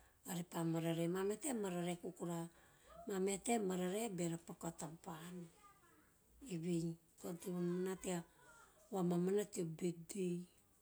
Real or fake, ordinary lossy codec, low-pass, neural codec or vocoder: real; none; none; none